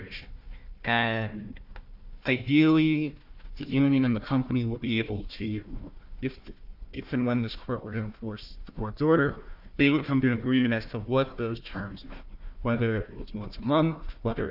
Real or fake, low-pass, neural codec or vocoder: fake; 5.4 kHz; codec, 16 kHz, 1 kbps, FunCodec, trained on Chinese and English, 50 frames a second